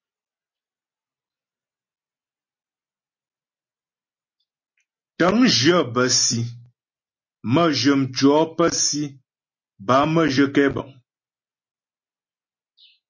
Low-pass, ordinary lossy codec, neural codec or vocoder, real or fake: 7.2 kHz; MP3, 32 kbps; none; real